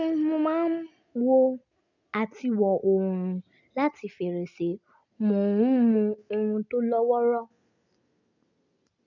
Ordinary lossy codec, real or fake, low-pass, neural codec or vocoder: none; real; 7.2 kHz; none